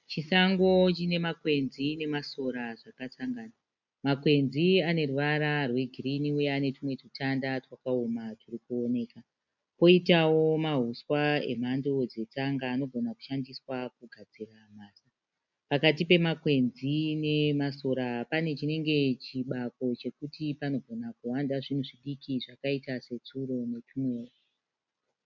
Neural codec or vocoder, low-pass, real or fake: none; 7.2 kHz; real